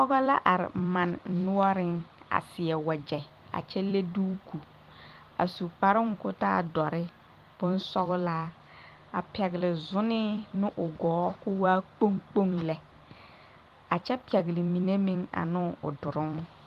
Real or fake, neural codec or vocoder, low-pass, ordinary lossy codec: fake; vocoder, 44.1 kHz, 128 mel bands every 256 samples, BigVGAN v2; 14.4 kHz; Opus, 24 kbps